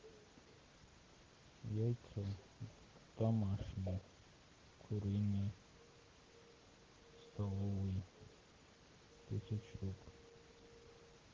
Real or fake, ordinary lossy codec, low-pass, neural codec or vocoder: real; Opus, 16 kbps; 7.2 kHz; none